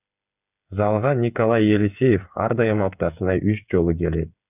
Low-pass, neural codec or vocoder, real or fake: 3.6 kHz; codec, 16 kHz, 8 kbps, FreqCodec, smaller model; fake